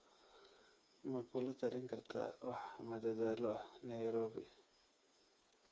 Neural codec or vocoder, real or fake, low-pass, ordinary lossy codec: codec, 16 kHz, 4 kbps, FreqCodec, smaller model; fake; none; none